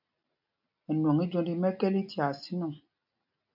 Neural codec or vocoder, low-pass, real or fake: none; 5.4 kHz; real